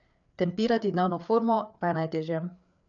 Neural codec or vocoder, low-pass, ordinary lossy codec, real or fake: codec, 16 kHz, 4 kbps, FreqCodec, larger model; 7.2 kHz; none; fake